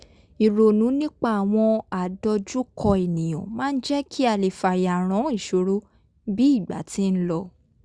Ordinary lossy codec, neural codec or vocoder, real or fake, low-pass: Opus, 64 kbps; none; real; 9.9 kHz